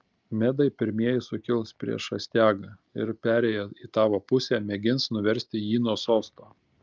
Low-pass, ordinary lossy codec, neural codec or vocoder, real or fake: 7.2 kHz; Opus, 24 kbps; none; real